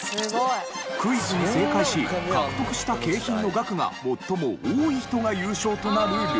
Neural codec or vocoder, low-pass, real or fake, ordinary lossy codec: none; none; real; none